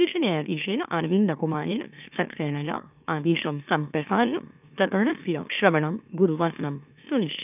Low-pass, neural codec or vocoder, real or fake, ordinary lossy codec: 3.6 kHz; autoencoder, 44.1 kHz, a latent of 192 numbers a frame, MeloTTS; fake; none